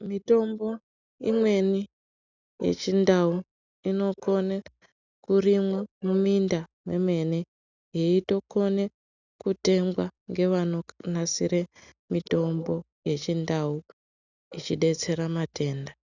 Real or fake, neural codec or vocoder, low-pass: real; none; 7.2 kHz